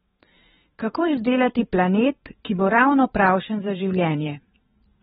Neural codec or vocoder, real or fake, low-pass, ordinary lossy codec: vocoder, 44.1 kHz, 128 mel bands every 512 samples, BigVGAN v2; fake; 19.8 kHz; AAC, 16 kbps